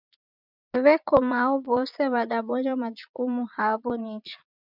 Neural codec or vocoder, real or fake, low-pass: vocoder, 22.05 kHz, 80 mel bands, Vocos; fake; 5.4 kHz